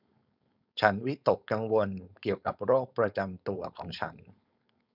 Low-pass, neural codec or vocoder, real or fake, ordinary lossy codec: 5.4 kHz; codec, 16 kHz, 4.8 kbps, FACodec; fake; none